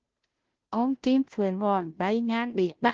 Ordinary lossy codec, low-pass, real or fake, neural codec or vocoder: Opus, 16 kbps; 7.2 kHz; fake; codec, 16 kHz, 0.5 kbps, FunCodec, trained on Chinese and English, 25 frames a second